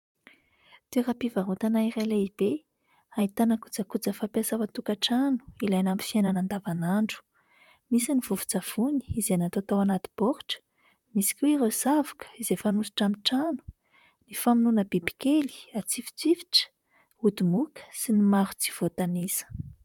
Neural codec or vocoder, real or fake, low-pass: vocoder, 44.1 kHz, 128 mel bands, Pupu-Vocoder; fake; 19.8 kHz